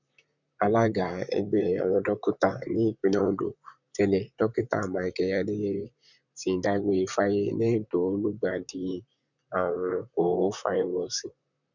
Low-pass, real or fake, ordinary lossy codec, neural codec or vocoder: 7.2 kHz; fake; none; vocoder, 44.1 kHz, 128 mel bands, Pupu-Vocoder